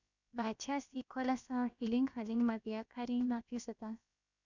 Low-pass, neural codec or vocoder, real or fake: 7.2 kHz; codec, 16 kHz, about 1 kbps, DyCAST, with the encoder's durations; fake